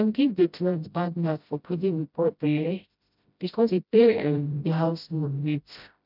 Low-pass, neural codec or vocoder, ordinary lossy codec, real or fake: 5.4 kHz; codec, 16 kHz, 0.5 kbps, FreqCodec, smaller model; none; fake